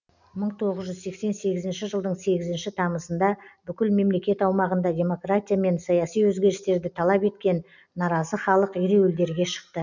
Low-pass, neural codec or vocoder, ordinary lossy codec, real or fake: 7.2 kHz; none; none; real